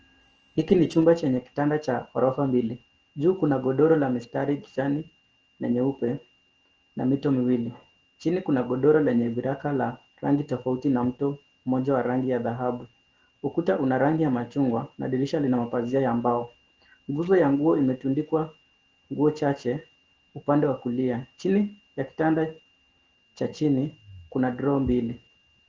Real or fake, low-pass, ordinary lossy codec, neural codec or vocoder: real; 7.2 kHz; Opus, 16 kbps; none